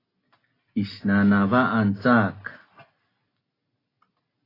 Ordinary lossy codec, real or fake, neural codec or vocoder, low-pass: AAC, 24 kbps; real; none; 5.4 kHz